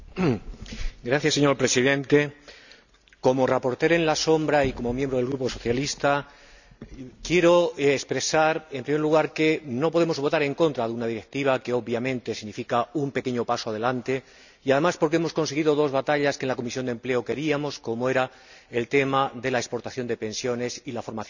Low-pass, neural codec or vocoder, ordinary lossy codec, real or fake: 7.2 kHz; none; none; real